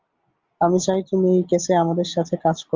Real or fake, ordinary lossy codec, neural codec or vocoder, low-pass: real; Opus, 32 kbps; none; 7.2 kHz